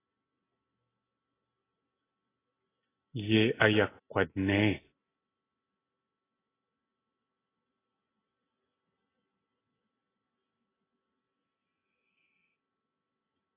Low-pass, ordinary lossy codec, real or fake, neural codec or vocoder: 3.6 kHz; AAC, 16 kbps; real; none